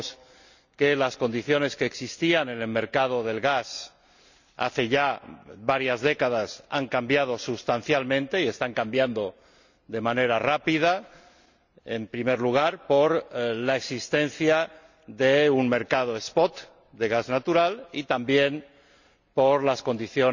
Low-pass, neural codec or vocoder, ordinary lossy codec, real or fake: 7.2 kHz; none; none; real